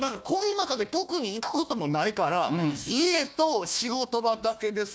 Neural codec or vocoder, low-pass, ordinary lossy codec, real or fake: codec, 16 kHz, 1 kbps, FunCodec, trained on Chinese and English, 50 frames a second; none; none; fake